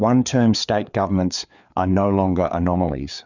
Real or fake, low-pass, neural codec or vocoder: fake; 7.2 kHz; codec, 16 kHz, 4 kbps, FreqCodec, larger model